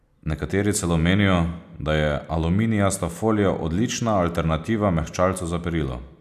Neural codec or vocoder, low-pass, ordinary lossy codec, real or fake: none; 14.4 kHz; none; real